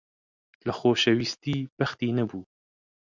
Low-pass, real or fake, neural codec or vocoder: 7.2 kHz; real; none